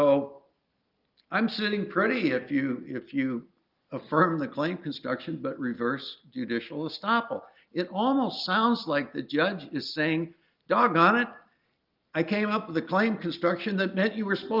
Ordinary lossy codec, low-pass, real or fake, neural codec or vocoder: Opus, 24 kbps; 5.4 kHz; real; none